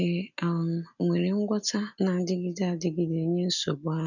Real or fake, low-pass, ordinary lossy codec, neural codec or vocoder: real; 7.2 kHz; none; none